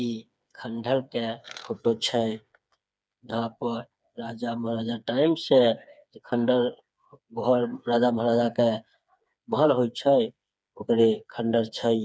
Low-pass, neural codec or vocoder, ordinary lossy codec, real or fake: none; codec, 16 kHz, 4 kbps, FreqCodec, smaller model; none; fake